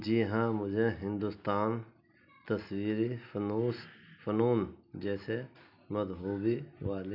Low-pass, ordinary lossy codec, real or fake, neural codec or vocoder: 5.4 kHz; none; real; none